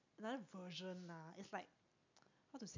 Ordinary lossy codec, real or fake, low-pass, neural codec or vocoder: MP3, 48 kbps; real; 7.2 kHz; none